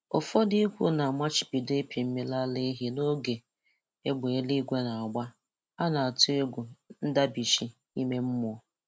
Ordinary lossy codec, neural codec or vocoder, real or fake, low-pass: none; none; real; none